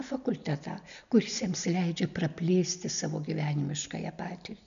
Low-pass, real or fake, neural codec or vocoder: 7.2 kHz; real; none